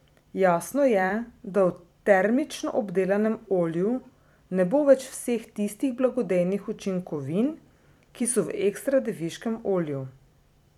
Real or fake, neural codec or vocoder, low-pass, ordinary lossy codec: fake; vocoder, 44.1 kHz, 128 mel bands every 256 samples, BigVGAN v2; 19.8 kHz; none